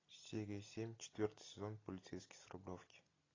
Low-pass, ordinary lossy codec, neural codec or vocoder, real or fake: 7.2 kHz; AAC, 32 kbps; none; real